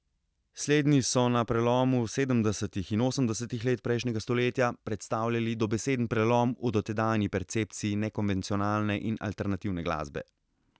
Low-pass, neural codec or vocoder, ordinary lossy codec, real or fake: none; none; none; real